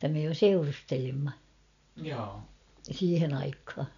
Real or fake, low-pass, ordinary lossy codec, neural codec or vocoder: real; 7.2 kHz; none; none